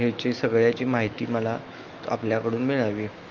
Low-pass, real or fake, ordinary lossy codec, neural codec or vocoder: 7.2 kHz; fake; Opus, 32 kbps; autoencoder, 48 kHz, 128 numbers a frame, DAC-VAE, trained on Japanese speech